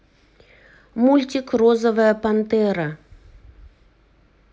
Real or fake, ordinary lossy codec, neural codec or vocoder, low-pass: real; none; none; none